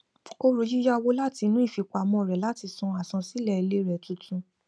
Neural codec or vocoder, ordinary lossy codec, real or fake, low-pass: none; none; real; none